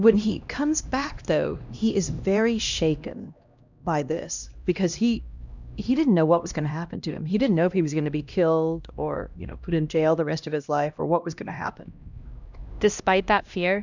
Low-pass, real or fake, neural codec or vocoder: 7.2 kHz; fake; codec, 16 kHz, 1 kbps, X-Codec, HuBERT features, trained on LibriSpeech